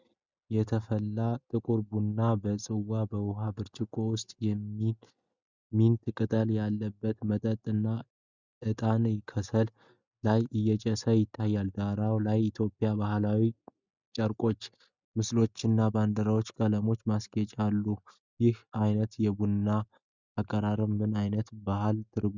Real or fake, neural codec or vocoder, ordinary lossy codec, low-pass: real; none; Opus, 64 kbps; 7.2 kHz